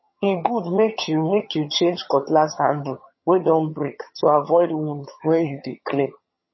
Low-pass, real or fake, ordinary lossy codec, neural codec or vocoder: 7.2 kHz; fake; MP3, 24 kbps; vocoder, 22.05 kHz, 80 mel bands, HiFi-GAN